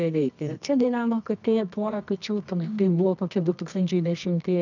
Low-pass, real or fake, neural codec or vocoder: 7.2 kHz; fake; codec, 24 kHz, 0.9 kbps, WavTokenizer, medium music audio release